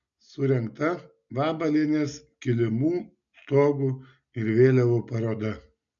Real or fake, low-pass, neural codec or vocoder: real; 7.2 kHz; none